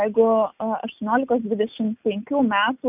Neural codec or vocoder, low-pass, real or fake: none; 3.6 kHz; real